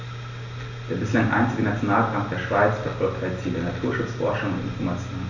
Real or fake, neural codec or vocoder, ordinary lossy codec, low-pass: real; none; none; 7.2 kHz